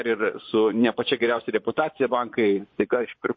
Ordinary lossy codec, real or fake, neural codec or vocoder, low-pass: MP3, 32 kbps; fake; vocoder, 24 kHz, 100 mel bands, Vocos; 7.2 kHz